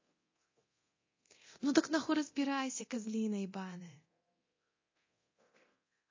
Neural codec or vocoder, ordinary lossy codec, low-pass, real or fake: codec, 24 kHz, 0.9 kbps, DualCodec; MP3, 32 kbps; 7.2 kHz; fake